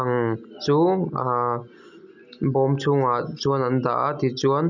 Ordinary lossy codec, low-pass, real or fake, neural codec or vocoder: none; 7.2 kHz; real; none